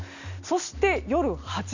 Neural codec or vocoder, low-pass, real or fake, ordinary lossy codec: none; 7.2 kHz; real; none